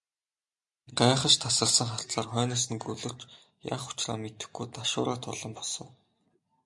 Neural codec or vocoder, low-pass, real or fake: none; 10.8 kHz; real